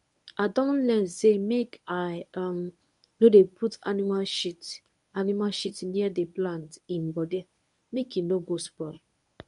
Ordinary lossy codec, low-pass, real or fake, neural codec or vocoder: none; 10.8 kHz; fake; codec, 24 kHz, 0.9 kbps, WavTokenizer, medium speech release version 1